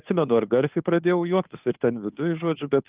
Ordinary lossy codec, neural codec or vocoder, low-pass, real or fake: Opus, 32 kbps; codec, 16 kHz, 2 kbps, FunCodec, trained on Chinese and English, 25 frames a second; 3.6 kHz; fake